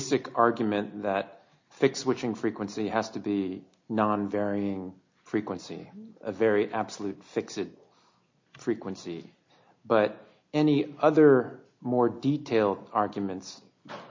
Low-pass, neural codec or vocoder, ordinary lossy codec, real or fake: 7.2 kHz; none; MP3, 48 kbps; real